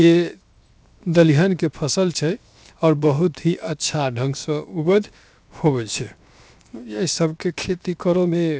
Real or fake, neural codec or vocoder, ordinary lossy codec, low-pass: fake; codec, 16 kHz, 0.7 kbps, FocalCodec; none; none